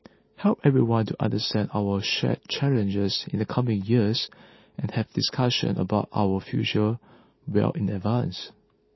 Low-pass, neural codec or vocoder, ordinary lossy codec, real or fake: 7.2 kHz; none; MP3, 24 kbps; real